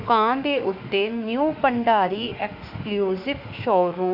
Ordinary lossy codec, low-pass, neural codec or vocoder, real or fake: none; 5.4 kHz; autoencoder, 48 kHz, 32 numbers a frame, DAC-VAE, trained on Japanese speech; fake